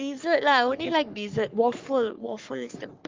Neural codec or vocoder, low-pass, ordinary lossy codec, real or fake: codec, 44.1 kHz, 3.4 kbps, Pupu-Codec; 7.2 kHz; Opus, 32 kbps; fake